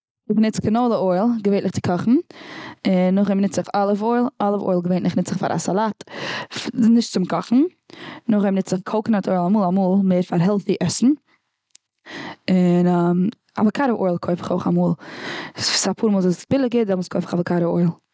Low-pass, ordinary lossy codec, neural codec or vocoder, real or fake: none; none; none; real